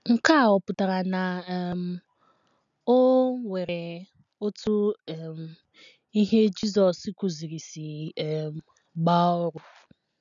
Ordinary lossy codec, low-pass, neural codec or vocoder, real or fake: none; 7.2 kHz; none; real